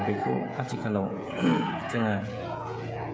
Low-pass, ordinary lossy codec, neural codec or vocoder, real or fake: none; none; codec, 16 kHz, 16 kbps, FreqCodec, smaller model; fake